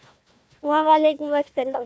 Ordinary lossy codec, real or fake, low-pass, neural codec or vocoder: none; fake; none; codec, 16 kHz, 1 kbps, FunCodec, trained on Chinese and English, 50 frames a second